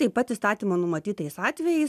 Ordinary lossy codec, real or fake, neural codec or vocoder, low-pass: AAC, 96 kbps; real; none; 14.4 kHz